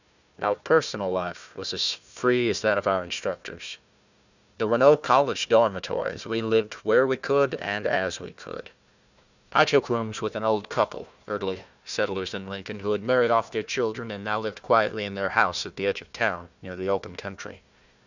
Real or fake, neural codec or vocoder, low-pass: fake; codec, 16 kHz, 1 kbps, FunCodec, trained on Chinese and English, 50 frames a second; 7.2 kHz